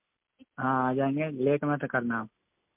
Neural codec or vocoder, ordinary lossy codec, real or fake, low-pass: none; MP3, 32 kbps; real; 3.6 kHz